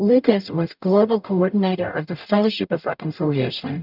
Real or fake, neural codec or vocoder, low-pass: fake; codec, 44.1 kHz, 0.9 kbps, DAC; 5.4 kHz